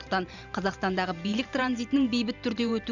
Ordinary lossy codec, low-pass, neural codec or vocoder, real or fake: none; 7.2 kHz; vocoder, 44.1 kHz, 128 mel bands every 512 samples, BigVGAN v2; fake